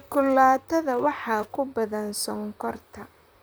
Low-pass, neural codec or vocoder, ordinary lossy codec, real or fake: none; vocoder, 44.1 kHz, 128 mel bands, Pupu-Vocoder; none; fake